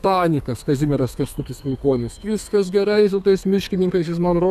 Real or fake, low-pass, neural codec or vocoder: fake; 14.4 kHz; codec, 32 kHz, 1.9 kbps, SNAC